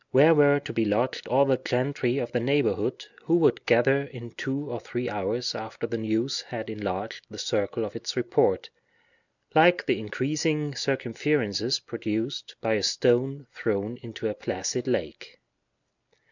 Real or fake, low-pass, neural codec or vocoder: real; 7.2 kHz; none